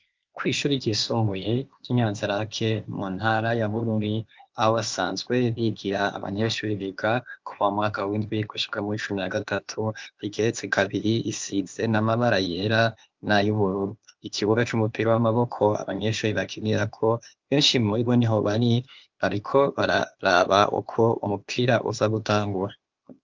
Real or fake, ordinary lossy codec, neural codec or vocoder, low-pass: fake; Opus, 24 kbps; codec, 16 kHz, 0.8 kbps, ZipCodec; 7.2 kHz